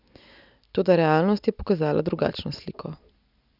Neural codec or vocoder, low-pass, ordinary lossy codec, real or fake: none; 5.4 kHz; none; real